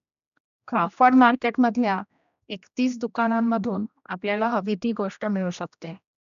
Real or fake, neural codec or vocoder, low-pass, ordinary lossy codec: fake; codec, 16 kHz, 1 kbps, X-Codec, HuBERT features, trained on general audio; 7.2 kHz; none